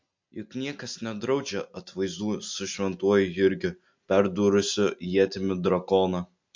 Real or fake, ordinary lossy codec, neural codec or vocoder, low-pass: real; MP3, 48 kbps; none; 7.2 kHz